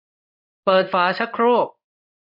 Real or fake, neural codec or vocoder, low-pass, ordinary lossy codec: fake; codec, 16 kHz in and 24 kHz out, 1 kbps, XY-Tokenizer; 5.4 kHz; none